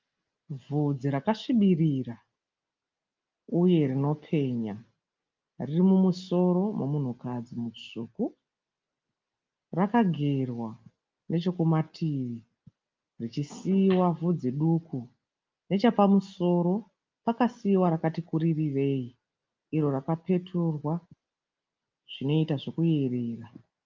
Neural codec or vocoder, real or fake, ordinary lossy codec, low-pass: none; real; Opus, 24 kbps; 7.2 kHz